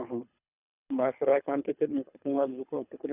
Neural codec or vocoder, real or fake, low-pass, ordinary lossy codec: codec, 24 kHz, 3 kbps, HILCodec; fake; 3.6 kHz; none